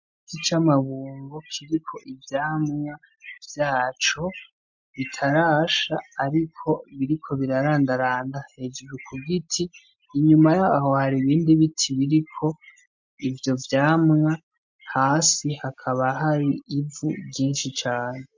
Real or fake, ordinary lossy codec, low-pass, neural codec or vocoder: real; MP3, 48 kbps; 7.2 kHz; none